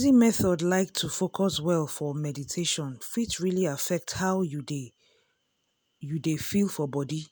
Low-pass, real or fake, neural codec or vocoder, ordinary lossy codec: none; real; none; none